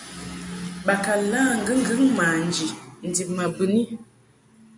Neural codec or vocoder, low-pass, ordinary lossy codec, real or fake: none; 10.8 kHz; MP3, 96 kbps; real